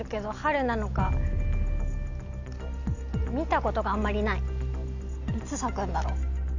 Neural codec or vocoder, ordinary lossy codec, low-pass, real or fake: none; none; 7.2 kHz; real